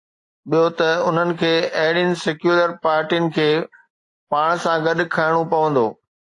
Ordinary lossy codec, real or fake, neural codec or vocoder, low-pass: AAC, 48 kbps; real; none; 10.8 kHz